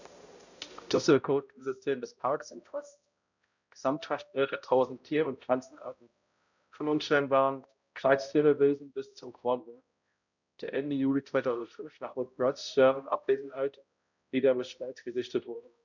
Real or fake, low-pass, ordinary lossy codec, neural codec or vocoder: fake; 7.2 kHz; none; codec, 16 kHz, 0.5 kbps, X-Codec, HuBERT features, trained on balanced general audio